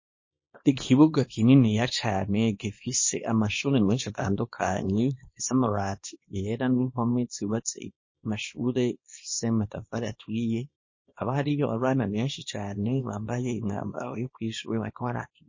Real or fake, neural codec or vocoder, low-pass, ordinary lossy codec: fake; codec, 24 kHz, 0.9 kbps, WavTokenizer, small release; 7.2 kHz; MP3, 32 kbps